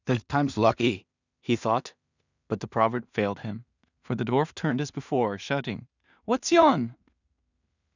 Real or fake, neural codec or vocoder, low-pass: fake; codec, 16 kHz in and 24 kHz out, 0.4 kbps, LongCat-Audio-Codec, two codebook decoder; 7.2 kHz